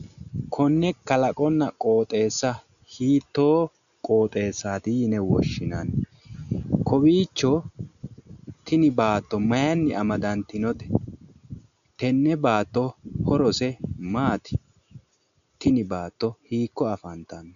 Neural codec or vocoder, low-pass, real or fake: none; 7.2 kHz; real